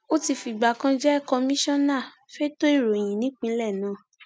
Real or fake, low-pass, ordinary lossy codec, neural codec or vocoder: real; none; none; none